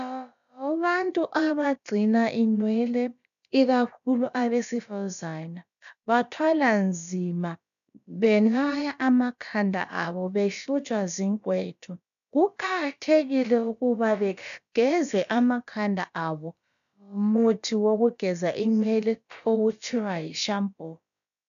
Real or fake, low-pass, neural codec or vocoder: fake; 7.2 kHz; codec, 16 kHz, about 1 kbps, DyCAST, with the encoder's durations